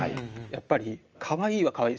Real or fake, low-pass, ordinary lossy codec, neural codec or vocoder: real; 7.2 kHz; Opus, 24 kbps; none